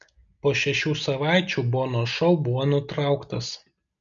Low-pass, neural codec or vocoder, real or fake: 7.2 kHz; none; real